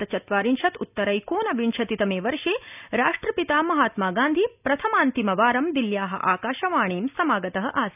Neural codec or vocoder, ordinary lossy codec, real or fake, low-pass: none; none; real; 3.6 kHz